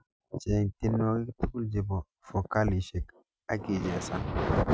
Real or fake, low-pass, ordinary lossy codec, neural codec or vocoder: real; none; none; none